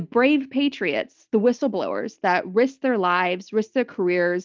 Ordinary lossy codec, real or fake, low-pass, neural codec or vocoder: Opus, 32 kbps; real; 7.2 kHz; none